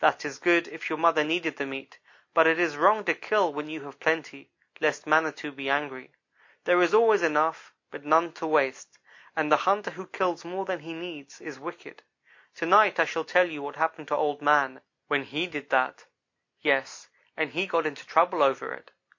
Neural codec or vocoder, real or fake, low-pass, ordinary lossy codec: none; real; 7.2 kHz; MP3, 64 kbps